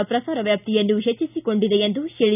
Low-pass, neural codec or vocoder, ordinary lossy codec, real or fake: 3.6 kHz; none; none; real